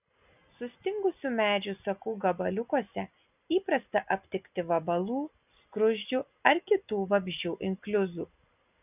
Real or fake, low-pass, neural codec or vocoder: real; 3.6 kHz; none